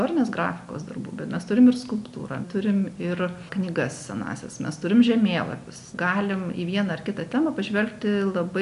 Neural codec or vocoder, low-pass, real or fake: none; 10.8 kHz; real